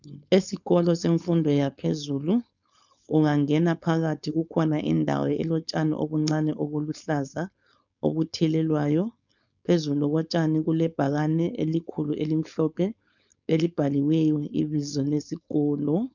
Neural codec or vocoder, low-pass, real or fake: codec, 16 kHz, 4.8 kbps, FACodec; 7.2 kHz; fake